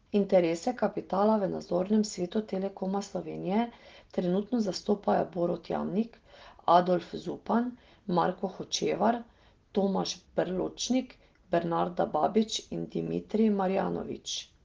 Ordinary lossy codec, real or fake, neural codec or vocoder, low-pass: Opus, 16 kbps; real; none; 7.2 kHz